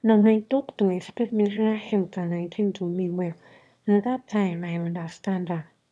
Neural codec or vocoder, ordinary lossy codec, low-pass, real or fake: autoencoder, 22.05 kHz, a latent of 192 numbers a frame, VITS, trained on one speaker; none; none; fake